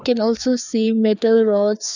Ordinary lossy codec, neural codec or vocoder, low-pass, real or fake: none; codec, 16 kHz, 2 kbps, FreqCodec, larger model; 7.2 kHz; fake